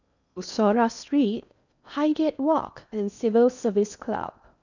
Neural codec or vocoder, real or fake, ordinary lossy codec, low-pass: codec, 16 kHz in and 24 kHz out, 0.8 kbps, FocalCodec, streaming, 65536 codes; fake; none; 7.2 kHz